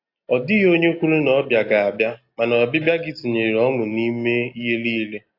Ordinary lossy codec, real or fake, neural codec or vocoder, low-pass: AAC, 32 kbps; real; none; 5.4 kHz